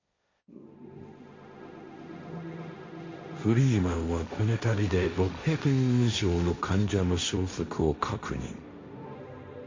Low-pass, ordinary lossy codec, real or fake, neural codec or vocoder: 7.2 kHz; MP3, 48 kbps; fake; codec, 16 kHz, 1.1 kbps, Voila-Tokenizer